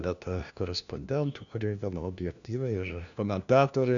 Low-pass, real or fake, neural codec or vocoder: 7.2 kHz; fake; codec, 16 kHz, 1 kbps, FunCodec, trained on LibriTTS, 50 frames a second